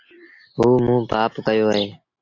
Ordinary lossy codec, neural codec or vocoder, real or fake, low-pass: AAC, 48 kbps; none; real; 7.2 kHz